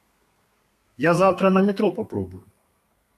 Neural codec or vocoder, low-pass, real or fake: codec, 32 kHz, 1.9 kbps, SNAC; 14.4 kHz; fake